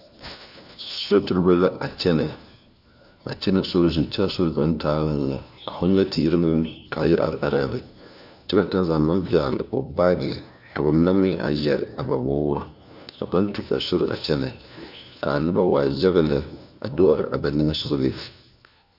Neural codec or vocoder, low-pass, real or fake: codec, 16 kHz, 1 kbps, FunCodec, trained on LibriTTS, 50 frames a second; 5.4 kHz; fake